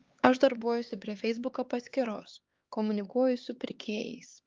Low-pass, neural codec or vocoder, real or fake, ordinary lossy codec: 7.2 kHz; codec, 16 kHz, 4 kbps, X-Codec, HuBERT features, trained on LibriSpeech; fake; Opus, 32 kbps